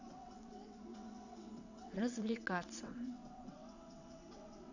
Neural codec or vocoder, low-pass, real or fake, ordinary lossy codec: vocoder, 22.05 kHz, 80 mel bands, WaveNeXt; 7.2 kHz; fake; none